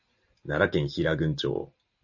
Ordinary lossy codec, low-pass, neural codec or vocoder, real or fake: AAC, 48 kbps; 7.2 kHz; none; real